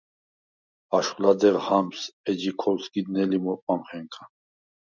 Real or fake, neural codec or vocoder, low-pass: real; none; 7.2 kHz